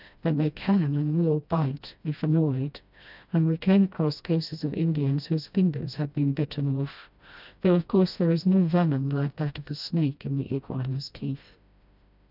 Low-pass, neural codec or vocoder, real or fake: 5.4 kHz; codec, 16 kHz, 1 kbps, FreqCodec, smaller model; fake